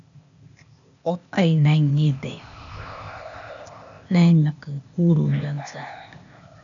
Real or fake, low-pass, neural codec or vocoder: fake; 7.2 kHz; codec, 16 kHz, 0.8 kbps, ZipCodec